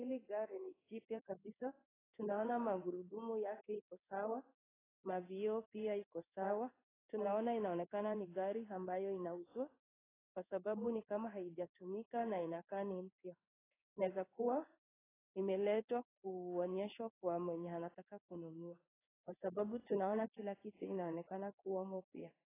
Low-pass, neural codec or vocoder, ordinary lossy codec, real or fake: 3.6 kHz; codec, 16 kHz in and 24 kHz out, 1 kbps, XY-Tokenizer; AAC, 16 kbps; fake